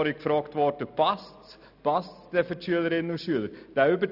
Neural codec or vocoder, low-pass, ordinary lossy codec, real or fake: none; 5.4 kHz; none; real